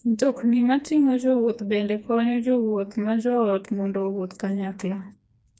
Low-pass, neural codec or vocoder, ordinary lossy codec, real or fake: none; codec, 16 kHz, 2 kbps, FreqCodec, smaller model; none; fake